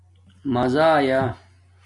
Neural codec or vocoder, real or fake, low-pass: none; real; 10.8 kHz